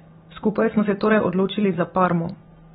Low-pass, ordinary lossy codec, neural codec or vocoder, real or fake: 7.2 kHz; AAC, 16 kbps; none; real